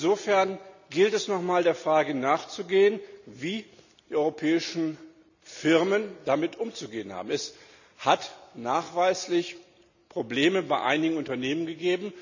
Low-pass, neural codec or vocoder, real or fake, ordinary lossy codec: 7.2 kHz; none; real; none